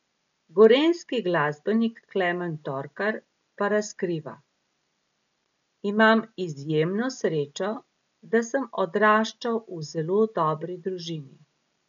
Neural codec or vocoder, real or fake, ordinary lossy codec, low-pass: none; real; none; 7.2 kHz